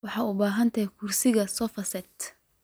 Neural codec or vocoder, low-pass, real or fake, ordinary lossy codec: none; none; real; none